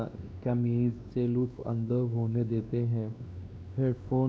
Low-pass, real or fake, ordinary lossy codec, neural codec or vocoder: none; fake; none; codec, 16 kHz, 2 kbps, X-Codec, WavLM features, trained on Multilingual LibriSpeech